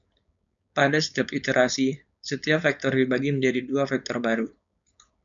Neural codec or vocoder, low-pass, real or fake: codec, 16 kHz, 4.8 kbps, FACodec; 7.2 kHz; fake